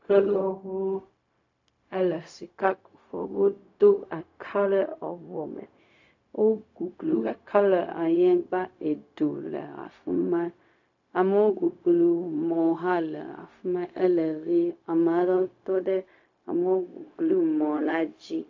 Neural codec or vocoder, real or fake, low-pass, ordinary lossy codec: codec, 16 kHz, 0.4 kbps, LongCat-Audio-Codec; fake; 7.2 kHz; AAC, 48 kbps